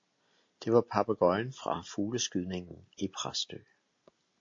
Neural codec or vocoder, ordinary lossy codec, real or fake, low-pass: none; AAC, 48 kbps; real; 7.2 kHz